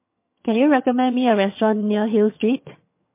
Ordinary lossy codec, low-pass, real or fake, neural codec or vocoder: MP3, 24 kbps; 3.6 kHz; fake; vocoder, 22.05 kHz, 80 mel bands, HiFi-GAN